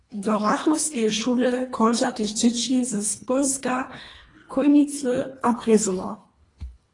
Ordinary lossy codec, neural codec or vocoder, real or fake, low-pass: AAC, 32 kbps; codec, 24 kHz, 1.5 kbps, HILCodec; fake; 10.8 kHz